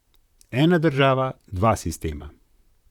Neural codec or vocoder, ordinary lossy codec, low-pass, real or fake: vocoder, 44.1 kHz, 128 mel bands, Pupu-Vocoder; none; 19.8 kHz; fake